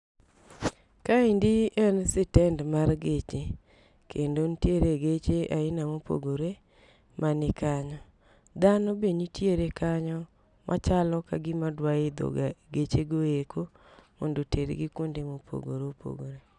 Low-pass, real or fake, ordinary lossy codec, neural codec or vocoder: 10.8 kHz; real; none; none